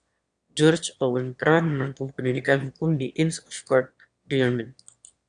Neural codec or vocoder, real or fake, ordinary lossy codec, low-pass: autoencoder, 22.05 kHz, a latent of 192 numbers a frame, VITS, trained on one speaker; fake; Opus, 64 kbps; 9.9 kHz